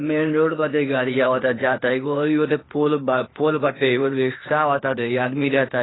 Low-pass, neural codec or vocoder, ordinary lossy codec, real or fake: 7.2 kHz; codec, 16 kHz, 0.8 kbps, ZipCodec; AAC, 16 kbps; fake